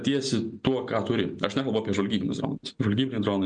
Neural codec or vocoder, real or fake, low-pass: none; real; 9.9 kHz